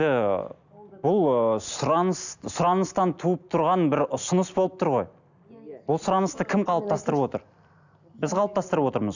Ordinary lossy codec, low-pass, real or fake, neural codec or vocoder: none; 7.2 kHz; real; none